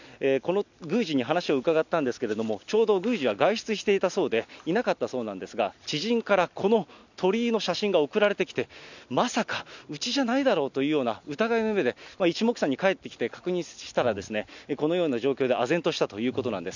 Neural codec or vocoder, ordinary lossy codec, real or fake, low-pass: none; none; real; 7.2 kHz